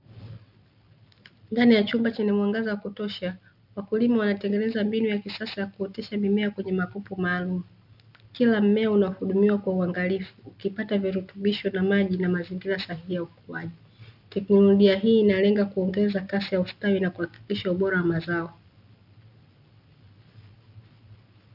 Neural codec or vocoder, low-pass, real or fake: none; 5.4 kHz; real